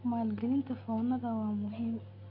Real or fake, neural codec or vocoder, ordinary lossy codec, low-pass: real; none; none; 5.4 kHz